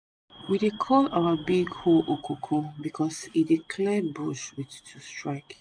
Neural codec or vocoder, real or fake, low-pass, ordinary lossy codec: none; real; 9.9 kHz; AAC, 64 kbps